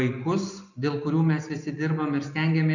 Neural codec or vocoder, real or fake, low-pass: none; real; 7.2 kHz